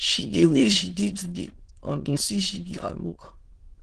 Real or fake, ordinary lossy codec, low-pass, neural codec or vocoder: fake; Opus, 16 kbps; 9.9 kHz; autoencoder, 22.05 kHz, a latent of 192 numbers a frame, VITS, trained on many speakers